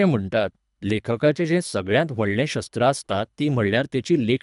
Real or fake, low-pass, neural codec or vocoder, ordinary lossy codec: fake; 10.8 kHz; codec, 24 kHz, 3 kbps, HILCodec; none